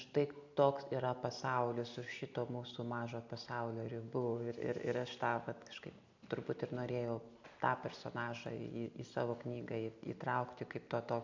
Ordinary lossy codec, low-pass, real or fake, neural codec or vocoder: MP3, 64 kbps; 7.2 kHz; real; none